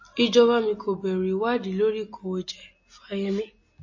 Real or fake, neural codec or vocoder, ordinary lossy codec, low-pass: real; none; MP3, 32 kbps; 7.2 kHz